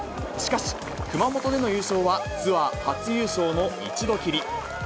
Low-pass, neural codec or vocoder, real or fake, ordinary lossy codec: none; none; real; none